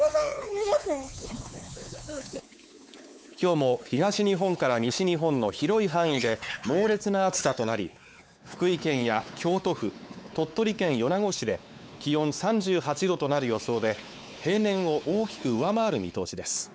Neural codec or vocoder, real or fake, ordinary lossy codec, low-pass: codec, 16 kHz, 4 kbps, X-Codec, WavLM features, trained on Multilingual LibriSpeech; fake; none; none